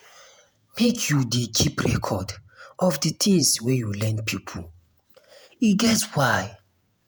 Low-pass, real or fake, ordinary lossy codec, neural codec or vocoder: none; fake; none; vocoder, 48 kHz, 128 mel bands, Vocos